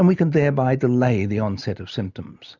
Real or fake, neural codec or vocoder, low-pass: real; none; 7.2 kHz